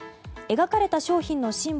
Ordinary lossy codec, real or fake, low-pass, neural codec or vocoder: none; real; none; none